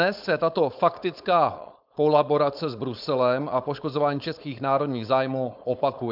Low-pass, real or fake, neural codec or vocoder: 5.4 kHz; fake; codec, 16 kHz, 4.8 kbps, FACodec